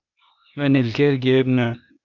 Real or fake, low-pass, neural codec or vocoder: fake; 7.2 kHz; codec, 16 kHz, 0.8 kbps, ZipCodec